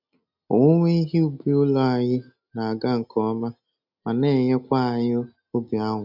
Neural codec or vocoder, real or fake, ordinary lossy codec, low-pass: none; real; none; 5.4 kHz